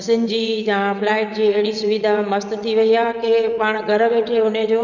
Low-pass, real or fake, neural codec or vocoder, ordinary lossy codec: 7.2 kHz; fake; vocoder, 22.05 kHz, 80 mel bands, WaveNeXt; none